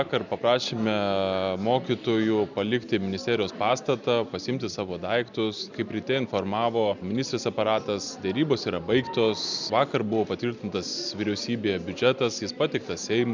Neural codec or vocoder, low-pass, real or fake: none; 7.2 kHz; real